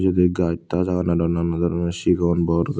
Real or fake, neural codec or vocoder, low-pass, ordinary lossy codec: real; none; none; none